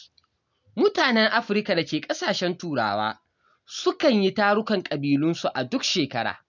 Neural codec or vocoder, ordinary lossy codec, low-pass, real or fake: none; none; 7.2 kHz; real